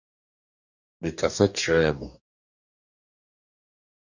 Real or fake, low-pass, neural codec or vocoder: fake; 7.2 kHz; codec, 44.1 kHz, 2.6 kbps, DAC